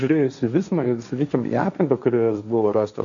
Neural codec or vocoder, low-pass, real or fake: codec, 16 kHz, 1.1 kbps, Voila-Tokenizer; 7.2 kHz; fake